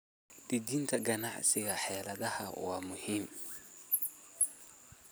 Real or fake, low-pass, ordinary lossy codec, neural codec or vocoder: real; none; none; none